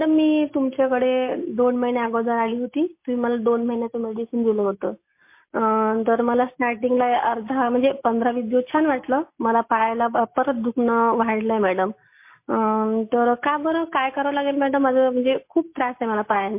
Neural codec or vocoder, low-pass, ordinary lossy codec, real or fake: none; 3.6 kHz; MP3, 24 kbps; real